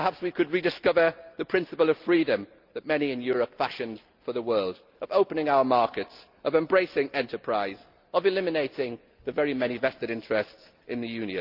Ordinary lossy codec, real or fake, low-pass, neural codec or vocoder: Opus, 24 kbps; real; 5.4 kHz; none